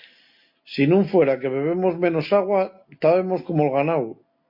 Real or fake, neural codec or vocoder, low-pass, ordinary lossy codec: real; none; 5.4 kHz; MP3, 32 kbps